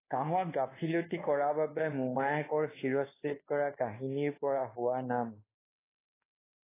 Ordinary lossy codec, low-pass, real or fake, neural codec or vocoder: AAC, 16 kbps; 3.6 kHz; fake; codec, 24 kHz, 1.2 kbps, DualCodec